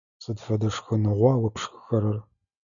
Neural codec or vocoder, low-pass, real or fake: none; 7.2 kHz; real